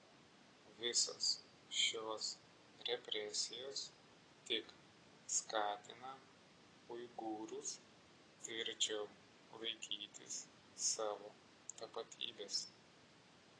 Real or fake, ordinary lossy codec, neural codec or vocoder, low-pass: real; AAC, 32 kbps; none; 9.9 kHz